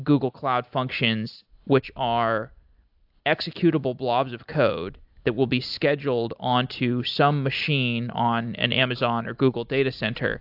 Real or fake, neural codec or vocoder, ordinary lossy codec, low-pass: real; none; AAC, 48 kbps; 5.4 kHz